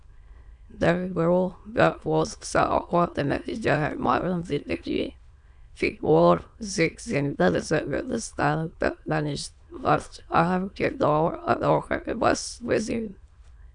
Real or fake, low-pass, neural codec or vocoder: fake; 9.9 kHz; autoencoder, 22.05 kHz, a latent of 192 numbers a frame, VITS, trained on many speakers